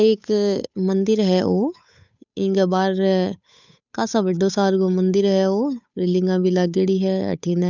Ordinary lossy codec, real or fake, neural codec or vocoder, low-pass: none; fake; codec, 16 kHz, 8 kbps, FunCodec, trained on Chinese and English, 25 frames a second; 7.2 kHz